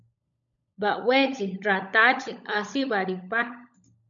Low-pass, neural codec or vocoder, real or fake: 7.2 kHz; codec, 16 kHz, 16 kbps, FunCodec, trained on LibriTTS, 50 frames a second; fake